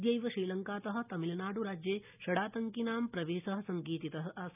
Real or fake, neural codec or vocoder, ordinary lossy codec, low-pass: real; none; none; 3.6 kHz